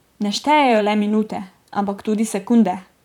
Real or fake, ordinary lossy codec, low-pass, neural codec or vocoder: fake; none; 19.8 kHz; vocoder, 44.1 kHz, 128 mel bands, Pupu-Vocoder